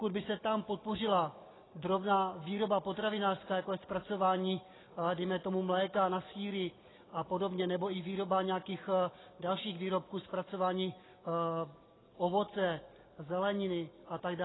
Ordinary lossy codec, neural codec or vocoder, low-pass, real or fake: AAC, 16 kbps; none; 7.2 kHz; real